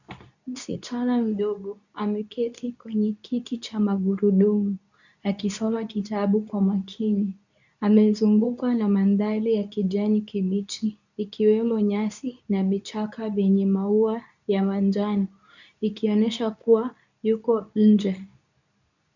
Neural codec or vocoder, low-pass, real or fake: codec, 24 kHz, 0.9 kbps, WavTokenizer, medium speech release version 2; 7.2 kHz; fake